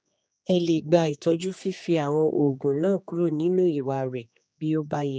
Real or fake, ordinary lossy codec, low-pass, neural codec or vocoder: fake; none; none; codec, 16 kHz, 2 kbps, X-Codec, HuBERT features, trained on general audio